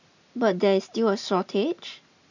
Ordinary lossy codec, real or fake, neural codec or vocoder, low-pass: none; real; none; 7.2 kHz